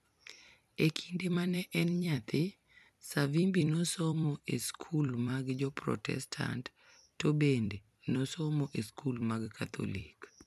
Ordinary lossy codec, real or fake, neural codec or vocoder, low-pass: none; fake; vocoder, 44.1 kHz, 128 mel bands every 256 samples, BigVGAN v2; 14.4 kHz